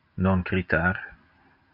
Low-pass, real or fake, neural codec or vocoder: 5.4 kHz; real; none